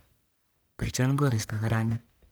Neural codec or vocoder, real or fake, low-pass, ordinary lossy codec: codec, 44.1 kHz, 1.7 kbps, Pupu-Codec; fake; none; none